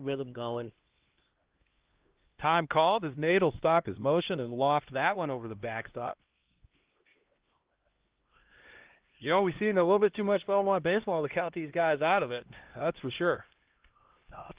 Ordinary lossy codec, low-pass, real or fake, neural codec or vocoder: Opus, 16 kbps; 3.6 kHz; fake; codec, 16 kHz, 1 kbps, X-Codec, HuBERT features, trained on LibriSpeech